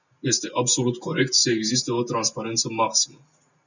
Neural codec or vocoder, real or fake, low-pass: none; real; 7.2 kHz